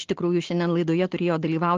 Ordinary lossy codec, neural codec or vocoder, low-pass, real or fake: Opus, 16 kbps; none; 7.2 kHz; real